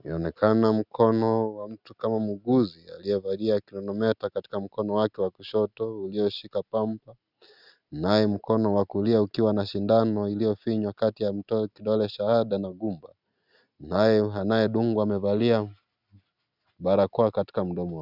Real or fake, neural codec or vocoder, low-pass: real; none; 5.4 kHz